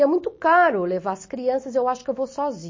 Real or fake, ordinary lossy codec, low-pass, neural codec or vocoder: real; MP3, 32 kbps; 7.2 kHz; none